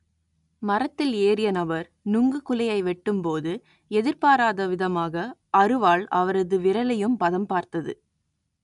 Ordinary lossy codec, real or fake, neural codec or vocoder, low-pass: none; real; none; 10.8 kHz